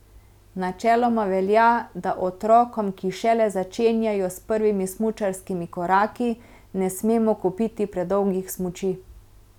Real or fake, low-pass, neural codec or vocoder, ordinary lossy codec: real; 19.8 kHz; none; none